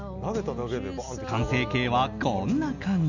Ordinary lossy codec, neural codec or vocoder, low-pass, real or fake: none; none; 7.2 kHz; real